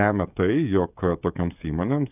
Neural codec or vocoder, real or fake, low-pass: codec, 16 kHz, 16 kbps, FreqCodec, smaller model; fake; 3.6 kHz